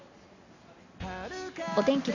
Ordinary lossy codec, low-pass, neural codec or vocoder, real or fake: none; 7.2 kHz; none; real